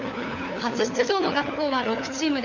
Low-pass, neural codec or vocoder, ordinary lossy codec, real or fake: 7.2 kHz; codec, 16 kHz, 4 kbps, FunCodec, trained on LibriTTS, 50 frames a second; none; fake